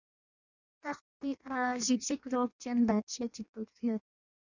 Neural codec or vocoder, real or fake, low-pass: codec, 16 kHz in and 24 kHz out, 0.6 kbps, FireRedTTS-2 codec; fake; 7.2 kHz